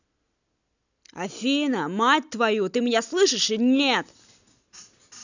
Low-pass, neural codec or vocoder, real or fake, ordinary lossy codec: 7.2 kHz; none; real; none